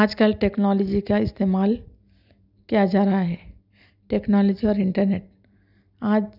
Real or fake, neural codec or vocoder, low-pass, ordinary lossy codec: real; none; 5.4 kHz; none